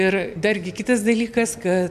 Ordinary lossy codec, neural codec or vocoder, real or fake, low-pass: MP3, 96 kbps; none; real; 14.4 kHz